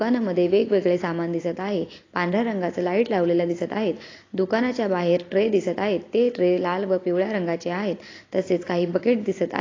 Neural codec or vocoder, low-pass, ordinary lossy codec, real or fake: none; 7.2 kHz; AAC, 32 kbps; real